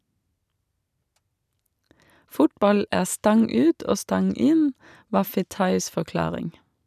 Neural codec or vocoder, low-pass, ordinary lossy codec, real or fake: none; 14.4 kHz; none; real